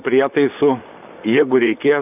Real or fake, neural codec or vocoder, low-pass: fake; vocoder, 44.1 kHz, 128 mel bands, Pupu-Vocoder; 3.6 kHz